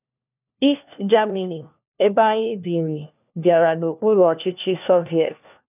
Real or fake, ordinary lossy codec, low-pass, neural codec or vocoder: fake; none; 3.6 kHz; codec, 16 kHz, 1 kbps, FunCodec, trained on LibriTTS, 50 frames a second